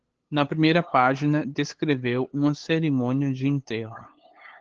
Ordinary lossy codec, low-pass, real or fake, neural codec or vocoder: Opus, 16 kbps; 7.2 kHz; fake; codec, 16 kHz, 8 kbps, FunCodec, trained on LibriTTS, 25 frames a second